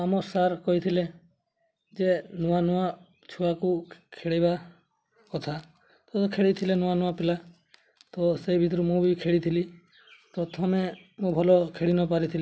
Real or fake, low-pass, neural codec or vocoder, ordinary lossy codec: real; none; none; none